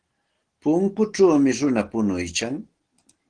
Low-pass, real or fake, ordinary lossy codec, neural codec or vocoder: 9.9 kHz; real; Opus, 16 kbps; none